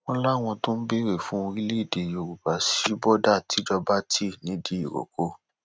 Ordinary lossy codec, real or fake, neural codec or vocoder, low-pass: none; real; none; none